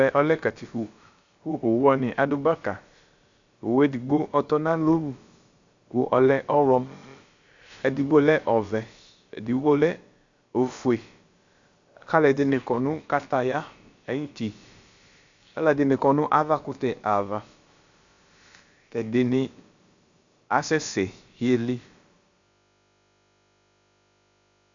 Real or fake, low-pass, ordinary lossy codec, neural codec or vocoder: fake; 7.2 kHz; Opus, 64 kbps; codec, 16 kHz, about 1 kbps, DyCAST, with the encoder's durations